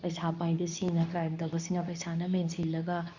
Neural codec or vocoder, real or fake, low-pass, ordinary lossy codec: codec, 24 kHz, 0.9 kbps, WavTokenizer, medium speech release version 2; fake; 7.2 kHz; none